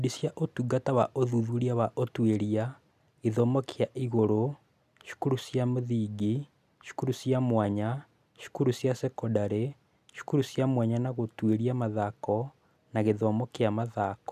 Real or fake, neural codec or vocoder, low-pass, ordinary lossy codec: real; none; 14.4 kHz; none